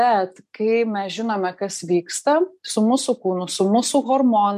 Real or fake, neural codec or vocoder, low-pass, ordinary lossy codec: real; none; 14.4 kHz; MP3, 64 kbps